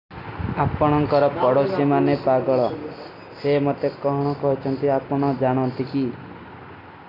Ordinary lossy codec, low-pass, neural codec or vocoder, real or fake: none; 5.4 kHz; none; real